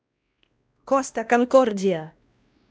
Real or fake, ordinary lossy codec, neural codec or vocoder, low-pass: fake; none; codec, 16 kHz, 1 kbps, X-Codec, WavLM features, trained on Multilingual LibriSpeech; none